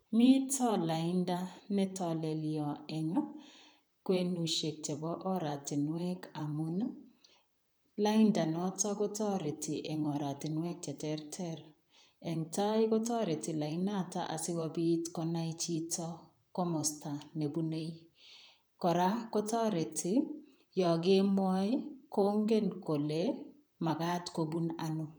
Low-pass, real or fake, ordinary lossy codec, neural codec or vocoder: none; fake; none; vocoder, 44.1 kHz, 128 mel bands, Pupu-Vocoder